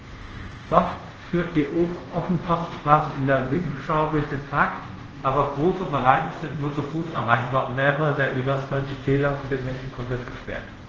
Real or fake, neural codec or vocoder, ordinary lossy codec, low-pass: fake; codec, 24 kHz, 0.5 kbps, DualCodec; Opus, 16 kbps; 7.2 kHz